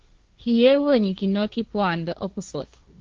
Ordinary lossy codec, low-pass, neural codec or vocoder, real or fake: Opus, 32 kbps; 7.2 kHz; codec, 16 kHz, 1.1 kbps, Voila-Tokenizer; fake